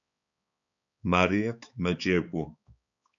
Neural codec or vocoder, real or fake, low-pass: codec, 16 kHz, 4 kbps, X-Codec, HuBERT features, trained on balanced general audio; fake; 7.2 kHz